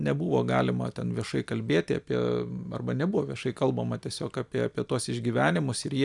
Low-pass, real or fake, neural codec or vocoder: 10.8 kHz; real; none